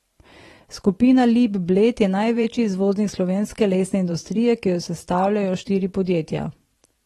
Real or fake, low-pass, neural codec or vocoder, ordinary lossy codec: fake; 19.8 kHz; vocoder, 44.1 kHz, 128 mel bands every 512 samples, BigVGAN v2; AAC, 32 kbps